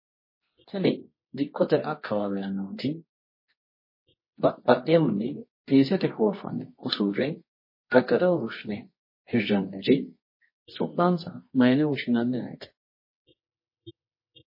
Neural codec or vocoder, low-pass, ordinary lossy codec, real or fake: codec, 24 kHz, 0.9 kbps, WavTokenizer, medium music audio release; 5.4 kHz; MP3, 24 kbps; fake